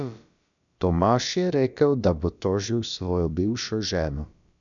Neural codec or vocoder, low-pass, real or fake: codec, 16 kHz, about 1 kbps, DyCAST, with the encoder's durations; 7.2 kHz; fake